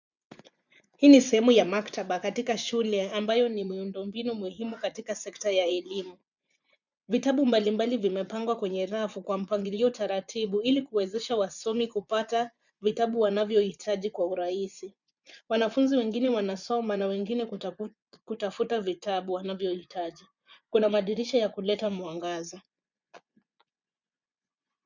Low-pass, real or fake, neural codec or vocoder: 7.2 kHz; fake; vocoder, 24 kHz, 100 mel bands, Vocos